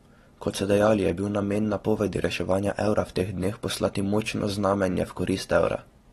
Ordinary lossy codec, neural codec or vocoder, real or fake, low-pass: AAC, 32 kbps; none; real; 19.8 kHz